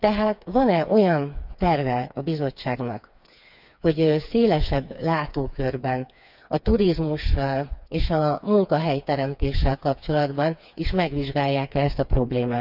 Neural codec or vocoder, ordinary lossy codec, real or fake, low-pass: codec, 16 kHz, 4 kbps, FreqCodec, smaller model; none; fake; 5.4 kHz